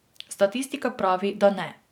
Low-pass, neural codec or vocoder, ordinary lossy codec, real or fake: 19.8 kHz; vocoder, 44.1 kHz, 128 mel bands every 512 samples, BigVGAN v2; none; fake